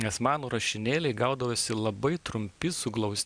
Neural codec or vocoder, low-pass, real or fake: none; 9.9 kHz; real